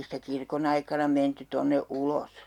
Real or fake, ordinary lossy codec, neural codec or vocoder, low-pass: real; none; none; 19.8 kHz